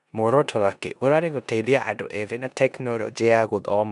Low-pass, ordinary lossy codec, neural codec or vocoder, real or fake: 10.8 kHz; none; codec, 16 kHz in and 24 kHz out, 0.9 kbps, LongCat-Audio-Codec, four codebook decoder; fake